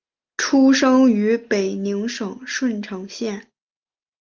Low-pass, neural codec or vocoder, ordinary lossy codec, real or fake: 7.2 kHz; none; Opus, 32 kbps; real